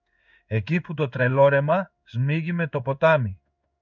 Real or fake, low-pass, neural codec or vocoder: fake; 7.2 kHz; codec, 16 kHz in and 24 kHz out, 1 kbps, XY-Tokenizer